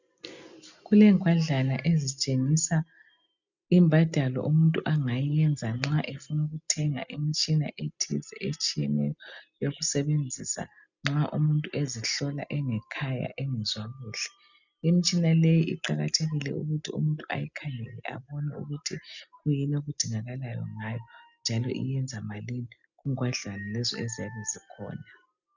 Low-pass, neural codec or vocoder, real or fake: 7.2 kHz; none; real